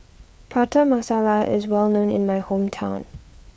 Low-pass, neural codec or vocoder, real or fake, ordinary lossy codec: none; codec, 16 kHz, 4 kbps, FreqCodec, larger model; fake; none